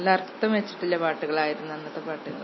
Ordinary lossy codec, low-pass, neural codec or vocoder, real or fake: MP3, 24 kbps; 7.2 kHz; none; real